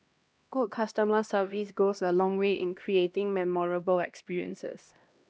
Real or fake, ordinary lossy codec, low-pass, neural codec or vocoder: fake; none; none; codec, 16 kHz, 1 kbps, X-Codec, HuBERT features, trained on LibriSpeech